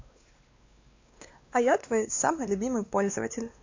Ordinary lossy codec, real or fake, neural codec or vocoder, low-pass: AAC, 48 kbps; fake; codec, 16 kHz, 2 kbps, X-Codec, WavLM features, trained on Multilingual LibriSpeech; 7.2 kHz